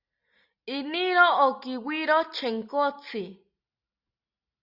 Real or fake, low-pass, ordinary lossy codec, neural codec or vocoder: real; 5.4 kHz; Opus, 64 kbps; none